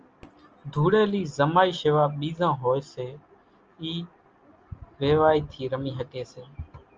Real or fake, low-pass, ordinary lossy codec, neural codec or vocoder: real; 7.2 kHz; Opus, 24 kbps; none